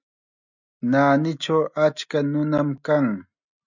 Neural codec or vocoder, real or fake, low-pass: none; real; 7.2 kHz